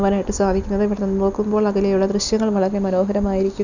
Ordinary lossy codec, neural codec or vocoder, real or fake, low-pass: none; none; real; 7.2 kHz